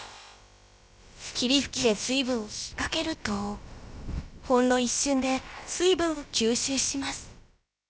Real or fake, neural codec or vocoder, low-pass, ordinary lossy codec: fake; codec, 16 kHz, about 1 kbps, DyCAST, with the encoder's durations; none; none